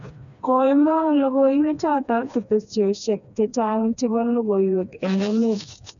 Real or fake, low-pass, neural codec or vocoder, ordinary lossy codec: fake; 7.2 kHz; codec, 16 kHz, 2 kbps, FreqCodec, smaller model; none